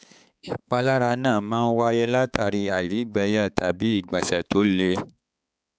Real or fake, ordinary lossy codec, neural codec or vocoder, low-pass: fake; none; codec, 16 kHz, 4 kbps, X-Codec, HuBERT features, trained on balanced general audio; none